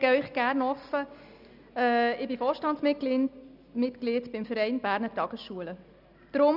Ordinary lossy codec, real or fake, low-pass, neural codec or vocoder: none; real; 5.4 kHz; none